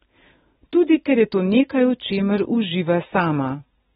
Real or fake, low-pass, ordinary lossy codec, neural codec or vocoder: real; 19.8 kHz; AAC, 16 kbps; none